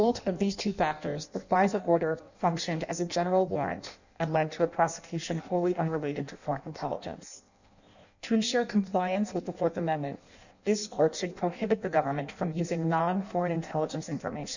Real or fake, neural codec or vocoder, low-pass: fake; codec, 16 kHz in and 24 kHz out, 0.6 kbps, FireRedTTS-2 codec; 7.2 kHz